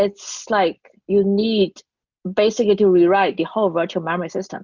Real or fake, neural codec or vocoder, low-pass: real; none; 7.2 kHz